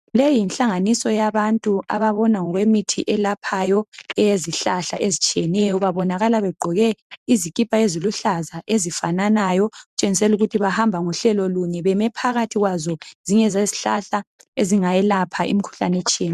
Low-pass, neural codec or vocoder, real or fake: 14.4 kHz; vocoder, 44.1 kHz, 128 mel bands every 512 samples, BigVGAN v2; fake